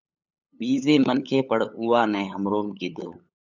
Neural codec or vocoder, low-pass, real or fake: codec, 16 kHz, 8 kbps, FunCodec, trained on LibriTTS, 25 frames a second; 7.2 kHz; fake